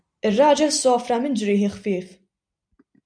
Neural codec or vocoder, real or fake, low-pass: none; real; 9.9 kHz